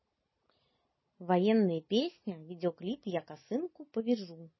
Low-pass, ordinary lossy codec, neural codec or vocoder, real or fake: 7.2 kHz; MP3, 24 kbps; none; real